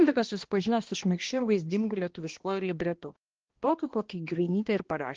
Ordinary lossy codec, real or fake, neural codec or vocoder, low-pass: Opus, 16 kbps; fake; codec, 16 kHz, 1 kbps, X-Codec, HuBERT features, trained on balanced general audio; 7.2 kHz